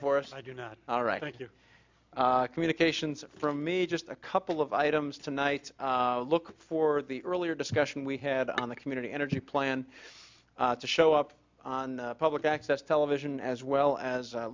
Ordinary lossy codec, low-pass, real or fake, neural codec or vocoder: MP3, 64 kbps; 7.2 kHz; real; none